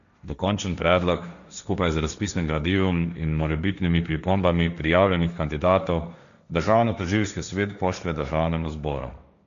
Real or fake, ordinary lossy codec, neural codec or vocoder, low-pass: fake; none; codec, 16 kHz, 1.1 kbps, Voila-Tokenizer; 7.2 kHz